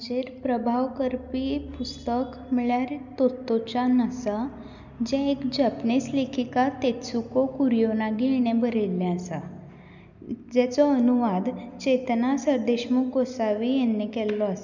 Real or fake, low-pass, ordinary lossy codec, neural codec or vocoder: real; 7.2 kHz; none; none